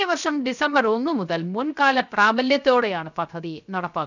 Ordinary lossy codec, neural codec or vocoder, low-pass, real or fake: none; codec, 16 kHz, 0.7 kbps, FocalCodec; 7.2 kHz; fake